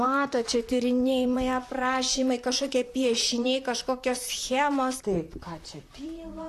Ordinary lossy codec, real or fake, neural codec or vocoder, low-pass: AAC, 64 kbps; fake; vocoder, 44.1 kHz, 128 mel bands, Pupu-Vocoder; 14.4 kHz